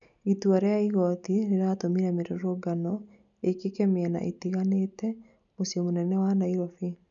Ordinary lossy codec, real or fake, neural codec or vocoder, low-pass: none; real; none; 7.2 kHz